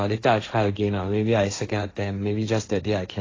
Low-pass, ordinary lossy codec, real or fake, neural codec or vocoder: 7.2 kHz; AAC, 32 kbps; fake; codec, 16 kHz, 1.1 kbps, Voila-Tokenizer